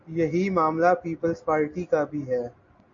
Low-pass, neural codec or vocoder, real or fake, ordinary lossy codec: 7.2 kHz; none; real; AAC, 64 kbps